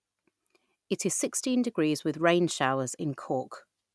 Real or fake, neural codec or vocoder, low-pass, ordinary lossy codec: real; none; none; none